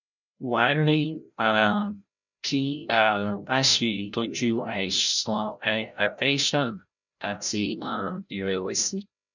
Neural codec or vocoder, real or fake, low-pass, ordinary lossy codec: codec, 16 kHz, 0.5 kbps, FreqCodec, larger model; fake; 7.2 kHz; none